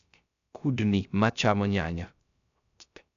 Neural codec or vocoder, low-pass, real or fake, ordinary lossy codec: codec, 16 kHz, 0.3 kbps, FocalCodec; 7.2 kHz; fake; none